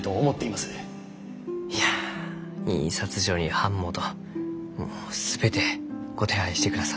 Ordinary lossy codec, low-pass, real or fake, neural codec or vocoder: none; none; real; none